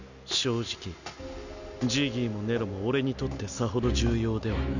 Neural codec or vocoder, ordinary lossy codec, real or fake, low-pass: none; none; real; 7.2 kHz